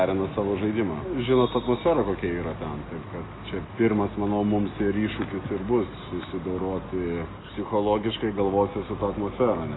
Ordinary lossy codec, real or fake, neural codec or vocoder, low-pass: AAC, 16 kbps; real; none; 7.2 kHz